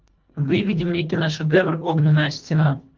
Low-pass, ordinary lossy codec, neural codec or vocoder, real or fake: 7.2 kHz; Opus, 24 kbps; codec, 24 kHz, 1.5 kbps, HILCodec; fake